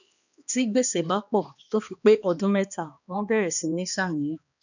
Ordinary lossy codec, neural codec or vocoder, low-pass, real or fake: none; codec, 16 kHz, 2 kbps, X-Codec, HuBERT features, trained on balanced general audio; 7.2 kHz; fake